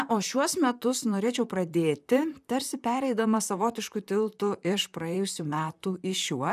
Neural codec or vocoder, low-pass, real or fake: vocoder, 44.1 kHz, 128 mel bands, Pupu-Vocoder; 14.4 kHz; fake